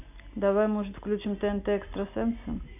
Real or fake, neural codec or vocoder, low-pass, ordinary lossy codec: real; none; 3.6 kHz; none